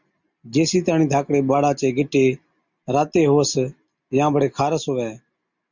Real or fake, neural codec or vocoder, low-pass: real; none; 7.2 kHz